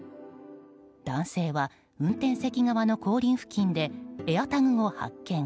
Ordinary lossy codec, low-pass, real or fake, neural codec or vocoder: none; none; real; none